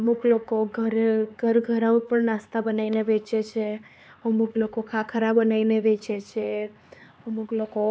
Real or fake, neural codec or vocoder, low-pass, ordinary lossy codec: fake; codec, 16 kHz, 4 kbps, X-Codec, HuBERT features, trained on LibriSpeech; none; none